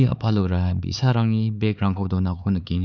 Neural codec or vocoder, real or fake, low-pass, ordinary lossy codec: codec, 16 kHz, 4 kbps, X-Codec, HuBERT features, trained on LibriSpeech; fake; 7.2 kHz; none